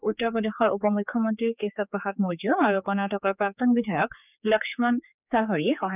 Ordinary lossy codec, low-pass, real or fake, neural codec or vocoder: none; 3.6 kHz; fake; codec, 16 kHz, 4 kbps, X-Codec, HuBERT features, trained on general audio